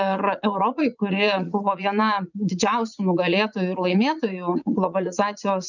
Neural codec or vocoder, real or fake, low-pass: vocoder, 44.1 kHz, 80 mel bands, Vocos; fake; 7.2 kHz